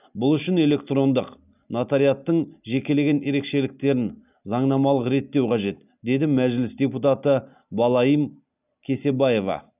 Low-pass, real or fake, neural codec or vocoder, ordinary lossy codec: 3.6 kHz; real; none; none